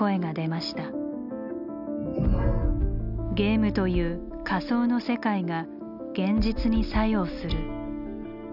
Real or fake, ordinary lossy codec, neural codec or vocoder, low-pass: real; none; none; 5.4 kHz